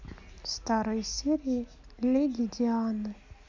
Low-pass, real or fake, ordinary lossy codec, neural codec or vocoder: 7.2 kHz; real; MP3, 64 kbps; none